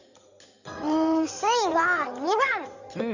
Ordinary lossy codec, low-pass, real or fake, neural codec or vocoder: none; 7.2 kHz; fake; codec, 16 kHz in and 24 kHz out, 2.2 kbps, FireRedTTS-2 codec